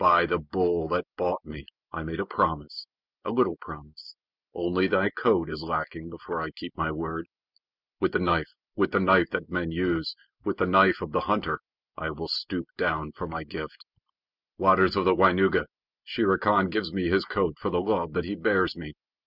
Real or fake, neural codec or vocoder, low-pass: real; none; 5.4 kHz